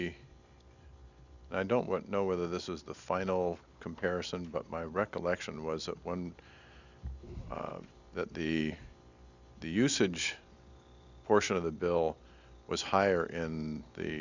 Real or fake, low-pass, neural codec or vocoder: real; 7.2 kHz; none